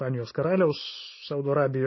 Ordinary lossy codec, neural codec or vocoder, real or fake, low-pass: MP3, 24 kbps; none; real; 7.2 kHz